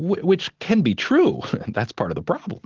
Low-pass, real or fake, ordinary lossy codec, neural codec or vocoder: 7.2 kHz; real; Opus, 16 kbps; none